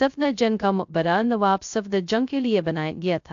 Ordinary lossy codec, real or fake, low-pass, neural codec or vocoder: AAC, 64 kbps; fake; 7.2 kHz; codec, 16 kHz, 0.2 kbps, FocalCodec